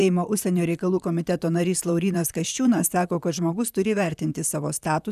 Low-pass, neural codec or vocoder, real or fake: 14.4 kHz; vocoder, 44.1 kHz, 128 mel bands, Pupu-Vocoder; fake